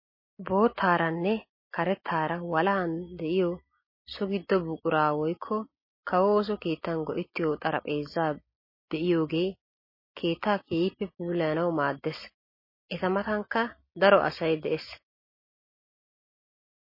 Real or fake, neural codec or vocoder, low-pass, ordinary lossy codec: real; none; 5.4 kHz; MP3, 24 kbps